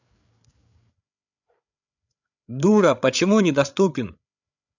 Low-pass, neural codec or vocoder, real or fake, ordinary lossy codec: 7.2 kHz; codec, 16 kHz, 8 kbps, FreqCodec, larger model; fake; none